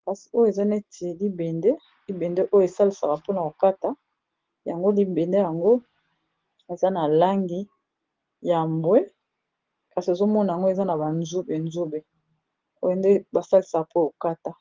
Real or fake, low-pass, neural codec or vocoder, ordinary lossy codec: real; 7.2 kHz; none; Opus, 16 kbps